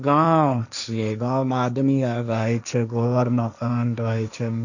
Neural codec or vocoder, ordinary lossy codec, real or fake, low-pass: codec, 16 kHz, 1.1 kbps, Voila-Tokenizer; none; fake; 7.2 kHz